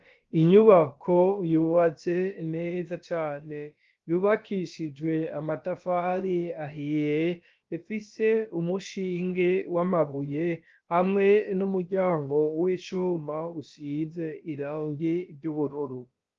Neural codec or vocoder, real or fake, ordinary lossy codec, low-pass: codec, 16 kHz, about 1 kbps, DyCAST, with the encoder's durations; fake; Opus, 32 kbps; 7.2 kHz